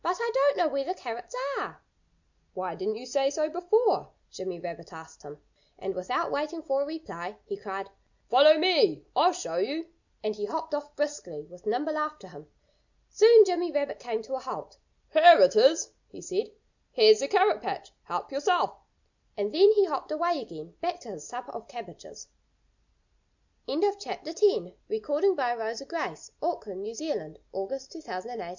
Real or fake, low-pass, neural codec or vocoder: real; 7.2 kHz; none